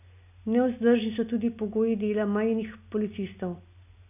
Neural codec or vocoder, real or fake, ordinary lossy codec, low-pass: none; real; none; 3.6 kHz